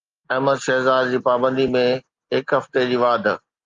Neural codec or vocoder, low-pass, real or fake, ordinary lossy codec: none; 7.2 kHz; real; Opus, 32 kbps